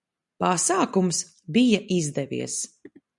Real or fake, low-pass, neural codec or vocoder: real; 10.8 kHz; none